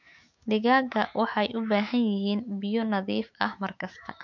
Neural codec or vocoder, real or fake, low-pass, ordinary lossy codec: autoencoder, 48 kHz, 128 numbers a frame, DAC-VAE, trained on Japanese speech; fake; 7.2 kHz; MP3, 48 kbps